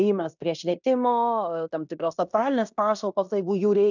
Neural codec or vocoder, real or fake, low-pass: codec, 16 kHz in and 24 kHz out, 0.9 kbps, LongCat-Audio-Codec, fine tuned four codebook decoder; fake; 7.2 kHz